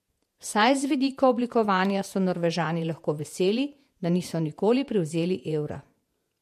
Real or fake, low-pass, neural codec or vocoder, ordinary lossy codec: fake; 14.4 kHz; vocoder, 48 kHz, 128 mel bands, Vocos; MP3, 64 kbps